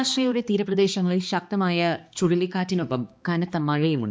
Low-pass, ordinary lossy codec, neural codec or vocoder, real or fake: none; none; codec, 16 kHz, 2 kbps, X-Codec, HuBERT features, trained on balanced general audio; fake